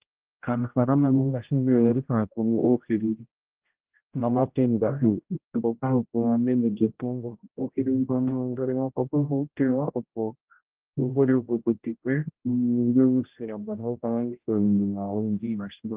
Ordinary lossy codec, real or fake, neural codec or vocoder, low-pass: Opus, 16 kbps; fake; codec, 16 kHz, 0.5 kbps, X-Codec, HuBERT features, trained on general audio; 3.6 kHz